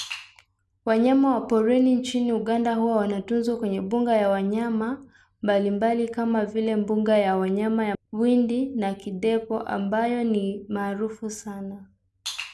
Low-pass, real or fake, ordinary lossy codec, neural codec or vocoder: none; real; none; none